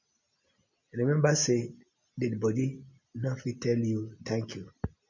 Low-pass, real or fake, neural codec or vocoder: 7.2 kHz; real; none